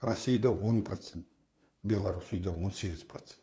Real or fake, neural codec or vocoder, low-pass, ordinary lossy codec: fake; codec, 16 kHz, 2 kbps, FunCodec, trained on LibriTTS, 25 frames a second; none; none